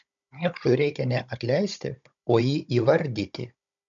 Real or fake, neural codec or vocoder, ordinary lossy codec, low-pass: fake; codec, 16 kHz, 16 kbps, FunCodec, trained on Chinese and English, 50 frames a second; MP3, 64 kbps; 7.2 kHz